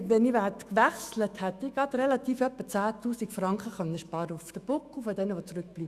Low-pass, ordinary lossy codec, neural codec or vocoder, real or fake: 14.4 kHz; Opus, 64 kbps; autoencoder, 48 kHz, 128 numbers a frame, DAC-VAE, trained on Japanese speech; fake